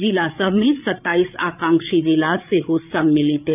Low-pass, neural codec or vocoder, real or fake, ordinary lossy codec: 3.6 kHz; codec, 16 kHz, 8 kbps, FreqCodec, larger model; fake; none